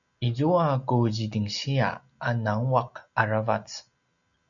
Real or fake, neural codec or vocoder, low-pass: real; none; 7.2 kHz